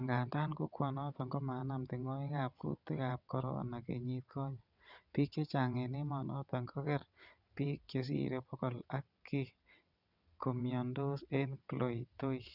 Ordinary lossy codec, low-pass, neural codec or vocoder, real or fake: none; 5.4 kHz; vocoder, 22.05 kHz, 80 mel bands, WaveNeXt; fake